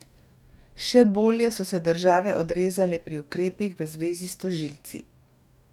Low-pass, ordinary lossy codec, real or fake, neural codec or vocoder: 19.8 kHz; none; fake; codec, 44.1 kHz, 2.6 kbps, DAC